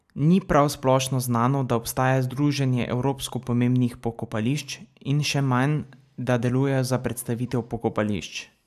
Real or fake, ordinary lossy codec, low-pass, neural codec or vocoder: real; none; 14.4 kHz; none